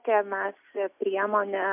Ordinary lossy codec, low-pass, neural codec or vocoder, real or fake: MP3, 32 kbps; 3.6 kHz; none; real